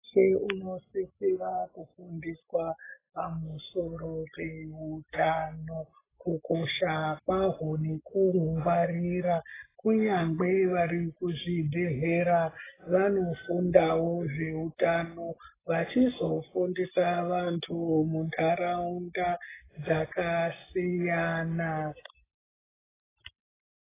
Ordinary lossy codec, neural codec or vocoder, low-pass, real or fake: AAC, 16 kbps; none; 3.6 kHz; real